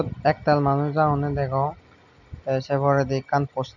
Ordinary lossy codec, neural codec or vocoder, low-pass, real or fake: none; none; 7.2 kHz; real